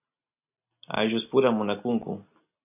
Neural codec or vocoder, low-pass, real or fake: none; 3.6 kHz; real